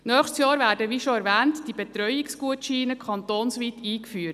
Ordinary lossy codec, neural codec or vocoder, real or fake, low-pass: none; none; real; 14.4 kHz